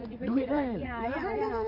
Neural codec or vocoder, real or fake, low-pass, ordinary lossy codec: vocoder, 44.1 kHz, 80 mel bands, Vocos; fake; 5.4 kHz; MP3, 32 kbps